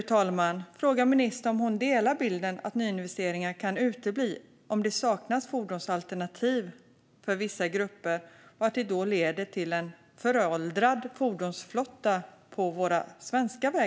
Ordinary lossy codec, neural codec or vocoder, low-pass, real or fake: none; none; none; real